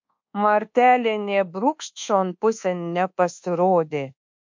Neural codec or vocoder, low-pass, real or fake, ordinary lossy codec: codec, 24 kHz, 1.2 kbps, DualCodec; 7.2 kHz; fake; MP3, 48 kbps